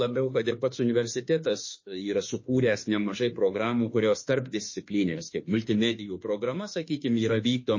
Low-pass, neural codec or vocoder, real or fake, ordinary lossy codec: 7.2 kHz; autoencoder, 48 kHz, 32 numbers a frame, DAC-VAE, trained on Japanese speech; fake; MP3, 32 kbps